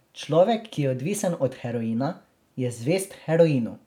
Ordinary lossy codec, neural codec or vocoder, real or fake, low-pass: none; none; real; 19.8 kHz